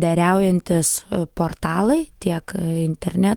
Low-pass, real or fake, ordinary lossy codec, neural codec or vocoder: 19.8 kHz; fake; Opus, 24 kbps; autoencoder, 48 kHz, 128 numbers a frame, DAC-VAE, trained on Japanese speech